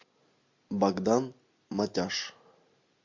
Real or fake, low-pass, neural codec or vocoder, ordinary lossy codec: real; 7.2 kHz; none; MP3, 48 kbps